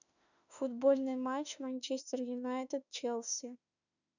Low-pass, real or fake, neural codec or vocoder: 7.2 kHz; fake; autoencoder, 48 kHz, 32 numbers a frame, DAC-VAE, trained on Japanese speech